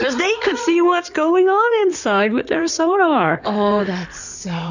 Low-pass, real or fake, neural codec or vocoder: 7.2 kHz; fake; codec, 16 kHz in and 24 kHz out, 2.2 kbps, FireRedTTS-2 codec